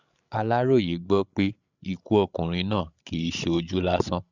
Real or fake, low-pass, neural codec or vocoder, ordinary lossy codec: fake; 7.2 kHz; codec, 16 kHz, 16 kbps, FreqCodec, larger model; none